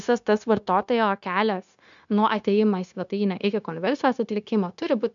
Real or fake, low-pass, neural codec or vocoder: fake; 7.2 kHz; codec, 16 kHz, 0.9 kbps, LongCat-Audio-Codec